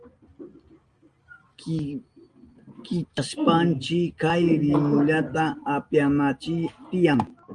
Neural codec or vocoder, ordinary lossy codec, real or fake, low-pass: none; Opus, 32 kbps; real; 9.9 kHz